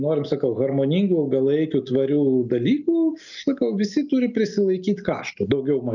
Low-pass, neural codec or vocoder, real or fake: 7.2 kHz; none; real